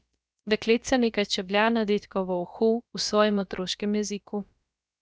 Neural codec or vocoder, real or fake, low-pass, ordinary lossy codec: codec, 16 kHz, about 1 kbps, DyCAST, with the encoder's durations; fake; none; none